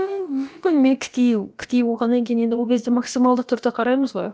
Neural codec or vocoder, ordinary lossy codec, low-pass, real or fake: codec, 16 kHz, about 1 kbps, DyCAST, with the encoder's durations; none; none; fake